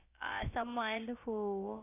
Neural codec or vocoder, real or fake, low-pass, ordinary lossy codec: codec, 16 kHz, about 1 kbps, DyCAST, with the encoder's durations; fake; 3.6 kHz; AAC, 24 kbps